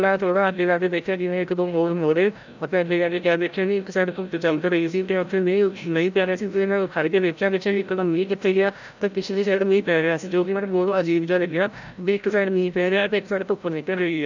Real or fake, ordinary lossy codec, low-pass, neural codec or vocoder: fake; none; 7.2 kHz; codec, 16 kHz, 0.5 kbps, FreqCodec, larger model